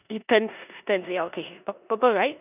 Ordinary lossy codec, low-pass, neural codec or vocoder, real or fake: none; 3.6 kHz; codec, 16 kHz in and 24 kHz out, 0.9 kbps, LongCat-Audio-Codec, four codebook decoder; fake